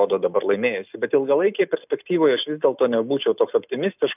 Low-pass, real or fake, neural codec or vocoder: 3.6 kHz; real; none